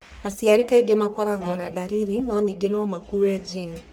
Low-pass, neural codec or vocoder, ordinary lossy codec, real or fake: none; codec, 44.1 kHz, 1.7 kbps, Pupu-Codec; none; fake